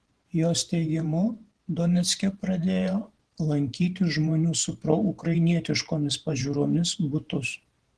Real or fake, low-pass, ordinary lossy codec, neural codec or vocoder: fake; 10.8 kHz; Opus, 16 kbps; vocoder, 44.1 kHz, 128 mel bands every 512 samples, BigVGAN v2